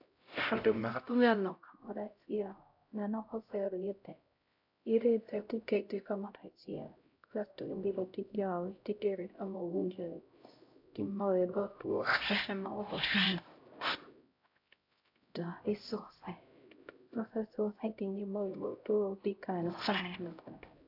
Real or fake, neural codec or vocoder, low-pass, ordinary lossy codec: fake; codec, 16 kHz, 0.5 kbps, X-Codec, HuBERT features, trained on LibriSpeech; 5.4 kHz; AAC, 48 kbps